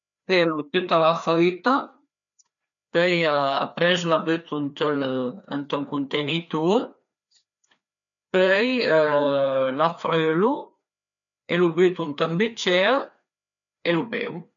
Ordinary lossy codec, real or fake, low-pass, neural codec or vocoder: none; fake; 7.2 kHz; codec, 16 kHz, 2 kbps, FreqCodec, larger model